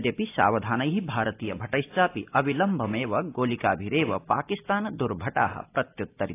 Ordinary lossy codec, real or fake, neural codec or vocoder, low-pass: AAC, 24 kbps; real; none; 3.6 kHz